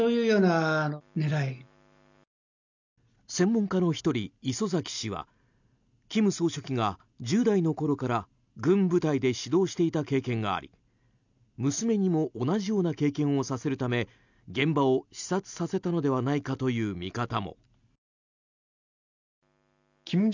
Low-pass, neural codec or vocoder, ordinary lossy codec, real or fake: 7.2 kHz; none; none; real